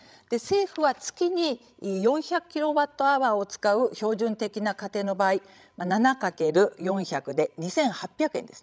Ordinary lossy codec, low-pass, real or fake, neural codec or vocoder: none; none; fake; codec, 16 kHz, 16 kbps, FreqCodec, larger model